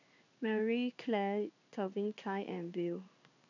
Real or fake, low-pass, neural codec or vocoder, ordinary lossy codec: fake; 7.2 kHz; codec, 16 kHz in and 24 kHz out, 1 kbps, XY-Tokenizer; none